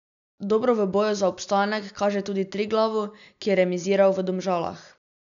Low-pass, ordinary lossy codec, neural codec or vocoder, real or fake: 7.2 kHz; none; none; real